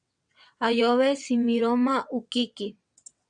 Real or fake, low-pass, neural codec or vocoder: fake; 9.9 kHz; vocoder, 22.05 kHz, 80 mel bands, WaveNeXt